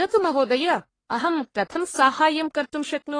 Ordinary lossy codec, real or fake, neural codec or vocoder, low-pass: AAC, 32 kbps; fake; codec, 44.1 kHz, 1.7 kbps, Pupu-Codec; 9.9 kHz